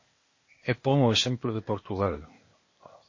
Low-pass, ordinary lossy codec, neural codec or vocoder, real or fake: 7.2 kHz; MP3, 32 kbps; codec, 16 kHz, 0.8 kbps, ZipCodec; fake